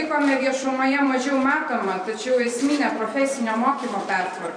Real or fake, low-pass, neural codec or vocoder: fake; 9.9 kHz; vocoder, 44.1 kHz, 128 mel bands every 512 samples, BigVGAN v2